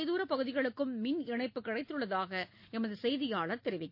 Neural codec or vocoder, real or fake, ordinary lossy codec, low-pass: none; real; MP3, 32 kbps; 5.4 kHz